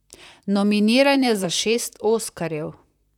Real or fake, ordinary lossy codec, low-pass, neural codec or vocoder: fake; none; 19.8 kHz; vocoder, 44.1 kHz, 128 mel bands, Pupu-Vocoder